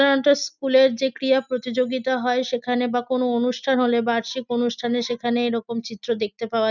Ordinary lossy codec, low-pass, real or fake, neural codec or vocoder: none; 7.2 kHz; real; none